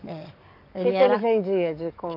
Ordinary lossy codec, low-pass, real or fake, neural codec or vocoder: none; 5.4 kHz; fake; codec, 44.1 kHz, 7.8 kbps, DAC